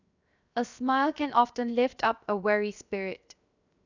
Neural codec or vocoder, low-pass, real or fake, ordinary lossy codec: codec, 16 kHz, 0.7 kbps, FocalCodec; 7.2 kHz; fake; none